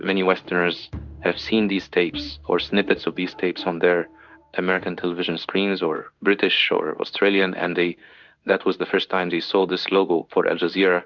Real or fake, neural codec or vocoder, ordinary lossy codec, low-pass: fake; codec, 16 kHz in and 24 kHz out, 1 kbps, XY-Tokenizer; Opus, 64 kbps; 7.2 kHz